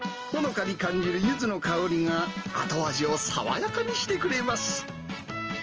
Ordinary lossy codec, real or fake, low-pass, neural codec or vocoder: Opus, 24 kbps; real; 7.2 kHz; none